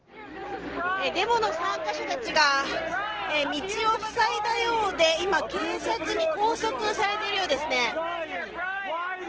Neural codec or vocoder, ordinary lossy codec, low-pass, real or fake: none; Opus, 16 kbps; 7.2 kHz; real